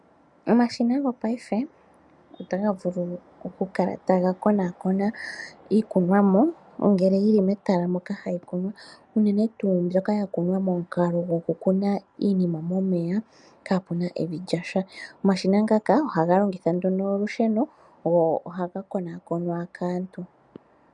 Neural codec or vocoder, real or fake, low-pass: none; real; 10.8 kHz